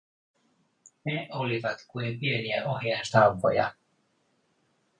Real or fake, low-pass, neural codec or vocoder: real; 9.9 kHz; none